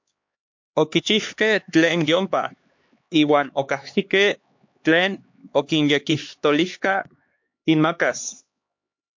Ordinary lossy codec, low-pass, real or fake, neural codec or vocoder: MP3, 48 kbps; 7.2 kHz; fake; codec, 16 kHz, 2 kbps, X-Codec, HuBERT features, trained on LibriSpeech